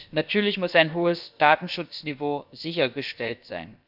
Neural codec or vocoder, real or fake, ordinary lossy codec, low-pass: codec, 16 kHz, about 1 kbps, DyCAST, with the encoder's durations; fake; none; 5.4 kHz